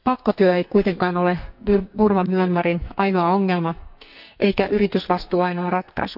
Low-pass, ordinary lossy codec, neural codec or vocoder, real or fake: 5.4 kHz; none; codec, 44.1 kHz, 2.6 kbps, SNAC; fake